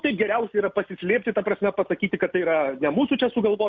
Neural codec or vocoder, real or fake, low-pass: none; real; 7.2 kHz